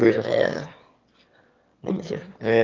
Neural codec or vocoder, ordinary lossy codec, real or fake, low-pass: autoencoder, 22.05 kHz, a latent of 192 numbers a frame, VITS, trained on one speaker; Opus, 32 kbps; fake; 7.2 kHz